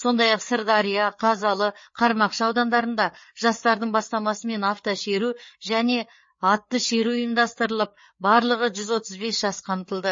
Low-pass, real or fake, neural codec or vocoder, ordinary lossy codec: 7.2 kHz; fake; codec, 16 kHz, 4 kbps, FreqCodec, larger model; MP3, 32 kbps